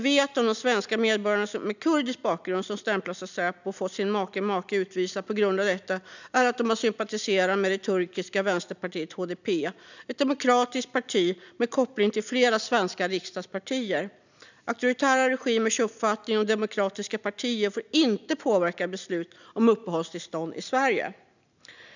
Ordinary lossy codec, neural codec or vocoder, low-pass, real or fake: none; none; 7.2 kHz; real